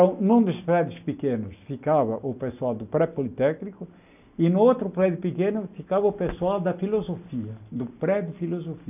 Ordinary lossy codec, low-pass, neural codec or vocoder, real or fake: none; 3.6 kHz; none; real